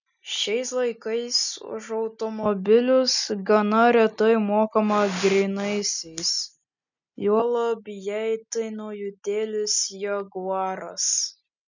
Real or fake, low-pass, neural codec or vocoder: real; 7.2 kHz; none